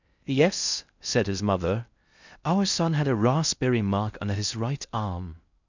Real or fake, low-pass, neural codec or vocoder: fake; 7.2 kHz; codec, 16 kHz in and 24 kHz out, 0.6 kbps, FocalCodec, streaming, 4096 codes